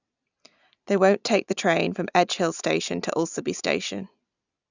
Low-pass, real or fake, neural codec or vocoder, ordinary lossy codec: 7.2 kHz; real; none; none